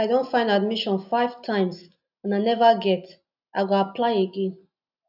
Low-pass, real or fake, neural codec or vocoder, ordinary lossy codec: 5.4 kHz; real; none; none